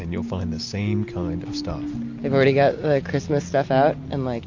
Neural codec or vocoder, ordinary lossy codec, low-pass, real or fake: none; MP3, 48 kbps; 7.2 kHz; real